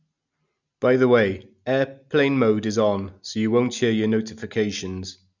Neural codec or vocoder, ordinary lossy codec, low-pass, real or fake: none; none; 7.2 kHz; real